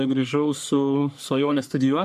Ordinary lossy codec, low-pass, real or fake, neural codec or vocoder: AAC, 96 kbps; 14.4 kHz; fake; codec, 44.1 kHz, 3.4 kbps, Pupu-Codec